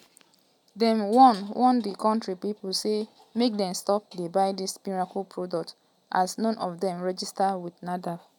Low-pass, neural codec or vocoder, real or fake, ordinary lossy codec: 19.8 kHz; none; real; none